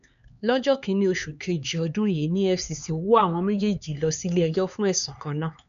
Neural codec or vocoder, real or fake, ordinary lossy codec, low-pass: codec, 16 kHz, 4 kbps, X-Codec, HuBERT features, trained on LibriSpeech; fake; none; 7.2 kHz